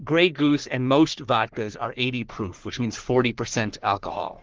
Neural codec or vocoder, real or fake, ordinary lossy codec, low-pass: codec, 44.1 kHz, 3.4 kbps, Pupu-Codec; fake; Opus, 16 kbps; 7.2 kHz